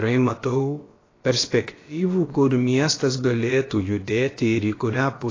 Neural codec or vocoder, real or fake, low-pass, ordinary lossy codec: codec, 16 kHz, about 1 kbps, DyCAST, with the encoder's durations; fake; 7.2 kHz; AAC, 32 kbps